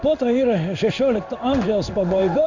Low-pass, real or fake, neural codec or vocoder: 7.2 kHz; fake; codec, 16 kHz in and 24 kHz out, 1 kbps, XY-Tokenizer